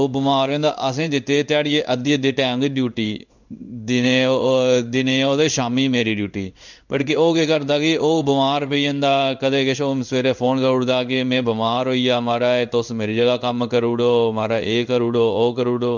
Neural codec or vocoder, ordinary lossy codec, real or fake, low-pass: codec, 16 kHz in and 24 kHz out, 1 kbps, XY-Tokenizer; none; fake; 7.2 kHz